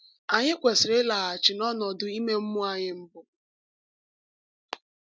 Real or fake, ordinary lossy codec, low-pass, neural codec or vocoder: real; none; none; none